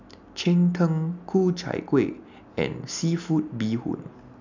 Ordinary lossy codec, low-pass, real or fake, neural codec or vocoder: none; 7.2 kHz; real; none